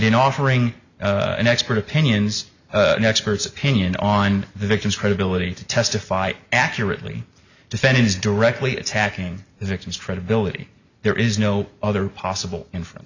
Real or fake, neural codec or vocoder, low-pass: real; none; 7.2 kHz